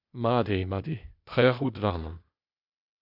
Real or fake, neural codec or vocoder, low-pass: fake; codec, 16 kHz, 0.8 kbps, ZipCodec; 5.4 kHz